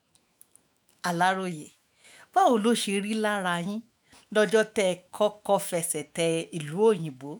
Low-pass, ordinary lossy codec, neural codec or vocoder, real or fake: none; none; autoencoder, 48 kHz, 128 numbers a frame, DAC-VAE, trained on Japanese speech; fake